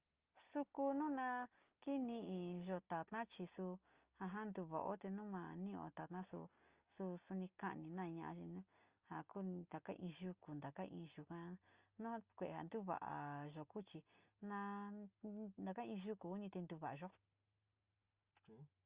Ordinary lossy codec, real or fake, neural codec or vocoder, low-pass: Opus, 32 kbps; real; none; 3.6 kHz